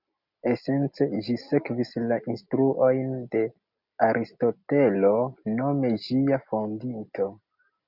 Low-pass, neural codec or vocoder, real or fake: 5.4 kHz; none; real